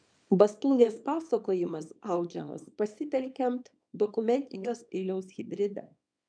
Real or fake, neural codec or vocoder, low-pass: fake; codec, 24 kHz, 0.9 kbps, WavTokenizer, small release; 9.9 kHz